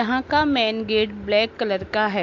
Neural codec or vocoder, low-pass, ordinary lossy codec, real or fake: none; 7.2 kHz; MP3, 48 kbps; real